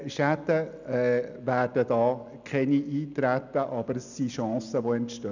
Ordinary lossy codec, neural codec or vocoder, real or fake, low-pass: none; none; real; 7.2 kHz